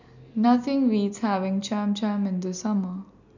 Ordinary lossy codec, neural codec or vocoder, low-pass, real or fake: none; none; 7.2 kHz; real